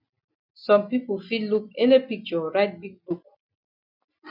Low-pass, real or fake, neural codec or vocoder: 5.4 kHz; real; none